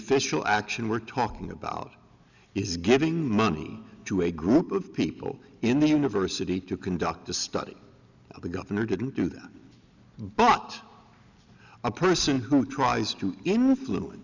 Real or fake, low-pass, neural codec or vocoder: real; 7.2 kHz; none